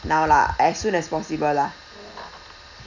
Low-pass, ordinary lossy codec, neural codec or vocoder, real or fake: 7.2 kHz; none; none; real